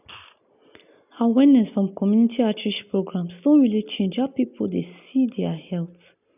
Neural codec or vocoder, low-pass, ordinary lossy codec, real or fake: none; 3.6 kHz; none; real